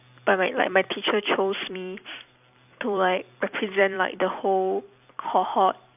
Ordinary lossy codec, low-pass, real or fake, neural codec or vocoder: AAC, 32 kbps; 3.6 kHz; fake; vocoder, 44.1 kHz, 128 mel bands every 256 samples, BigVGAN v2